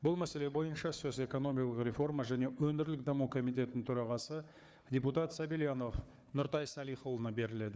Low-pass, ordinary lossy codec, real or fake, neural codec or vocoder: none; none; fake; codec, 16 kHz, 4 kbps, FunCodec, trained on Chinese and English, 50 frames a second